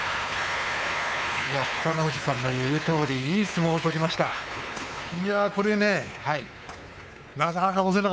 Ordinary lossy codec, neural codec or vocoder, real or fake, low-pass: none; codec, 16 kHz, 4 kbps, X-Codec, WavLM features, trained on Multilingual LibriSpeech; fake; none